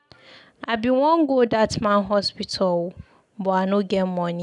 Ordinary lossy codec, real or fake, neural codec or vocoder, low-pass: none; real; none; 10.8 kHz